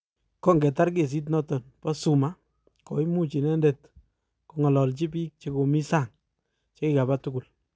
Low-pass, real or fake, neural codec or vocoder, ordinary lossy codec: none; real; none; none